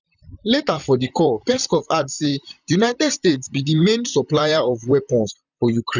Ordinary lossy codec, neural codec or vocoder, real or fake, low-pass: none; vocoder, 44.1 kHz, 128 mel bands, Pupu-Vocoder; fake; 7.2 kHz